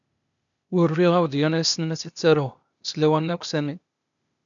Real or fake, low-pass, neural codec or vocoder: fake; 7.2 kHz; codec, 16 kHz, 0.8 kbps, ZipCodec